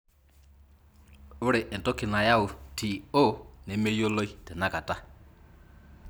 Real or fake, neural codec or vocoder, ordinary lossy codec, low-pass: real; none; none; none